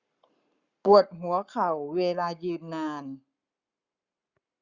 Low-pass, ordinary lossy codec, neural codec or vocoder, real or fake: 7.2 kHz; Opus, 64 kbps; codec, 44.1 kHz, 7.8 kbps, Pupu-Codec; fake